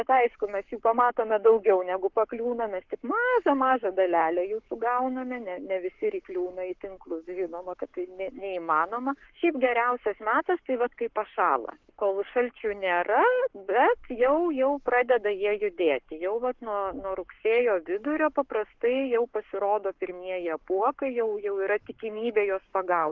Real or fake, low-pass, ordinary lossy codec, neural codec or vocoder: fake; 7.2 kHz; Opus, 16 kbps; codec, 44.1 kHz, 7.8 kbps, Pupu-Codec